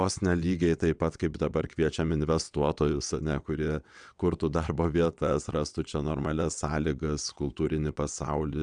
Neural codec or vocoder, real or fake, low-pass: vocoder, 22.05 kHz, 80 mel bands, WaveNeXt; fake; 9.9 kHz